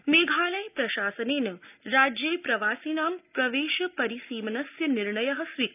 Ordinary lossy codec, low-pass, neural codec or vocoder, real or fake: none; 3.6 kHz; none; real